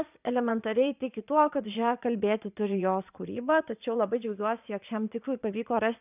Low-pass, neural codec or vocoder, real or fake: 3.6 kHz; vocoder, 22.05 kHz, 80 mel bands, Vocos; fake